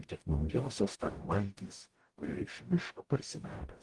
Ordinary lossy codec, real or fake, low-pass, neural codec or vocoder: Opus, 24 kbps; fake; 10.8 kHz; codec, 44.1 kHz, 0.9 kbps, DAC